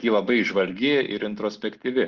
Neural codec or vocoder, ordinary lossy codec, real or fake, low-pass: none; Opus, 16 kbps; real; 7.2 kHz